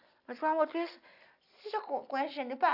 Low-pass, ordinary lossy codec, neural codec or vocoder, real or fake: 5.4 kHz; AAC, 48 kbps; none; real